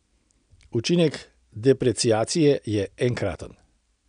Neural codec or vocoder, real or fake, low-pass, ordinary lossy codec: none; real; 9.9 kHz; none